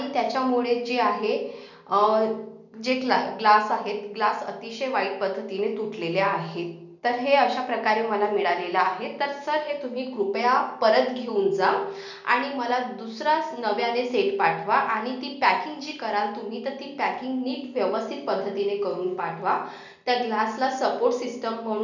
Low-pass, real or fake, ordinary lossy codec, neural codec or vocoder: 7.2 kHz; real; none; none